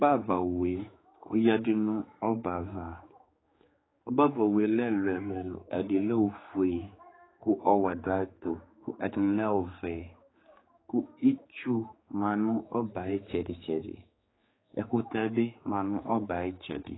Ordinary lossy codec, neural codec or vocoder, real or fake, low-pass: AAC, 16 kbps; codec, 16 kHz, 4 kbps, X-Codec, HuBERT features, trained on general audio; fake; 7.2 kHz